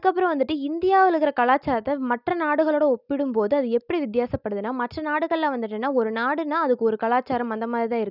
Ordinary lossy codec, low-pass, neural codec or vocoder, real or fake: none; 5.4 kHz; none; real